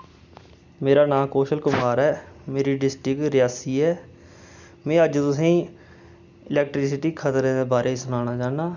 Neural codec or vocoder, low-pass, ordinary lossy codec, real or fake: none; 7.2 kHz; none; real